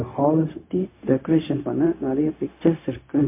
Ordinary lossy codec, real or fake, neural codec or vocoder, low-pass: MP3, 24 kbps; fake; codec, 16 kHz, 0.4 kbps, LongCat-Audio-Codec; 3.6 kHz